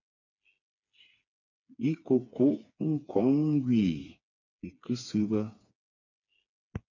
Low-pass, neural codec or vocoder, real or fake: 7.2 kHz; codec, 16 kHz, 4 kbps, FreqCodec, smaller model; fake